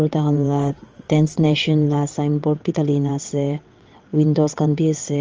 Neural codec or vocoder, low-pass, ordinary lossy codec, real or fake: vocoder, 22.05 kHz, 80 mel bands, WaveNeXt; 7.2 kHz; Opus, 24 kbps; fake